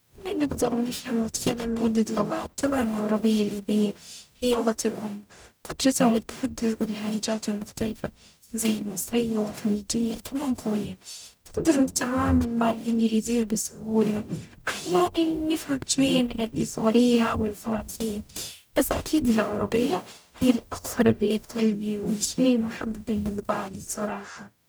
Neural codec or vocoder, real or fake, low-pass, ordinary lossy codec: codec, 44.1 kHz, 0.9 kbps, DAC; fake; none; none